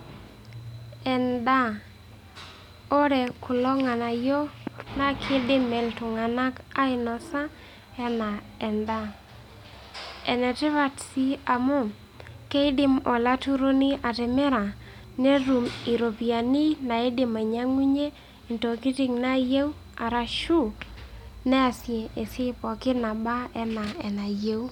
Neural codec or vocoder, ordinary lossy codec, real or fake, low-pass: none; none; real; 19.8 kHz